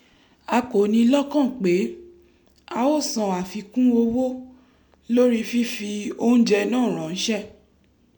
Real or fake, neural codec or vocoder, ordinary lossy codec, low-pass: real; none; MP3, 96 kbps; 19.8 kHz